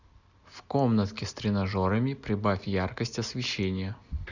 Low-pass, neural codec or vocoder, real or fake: 7.2 kHz; none; real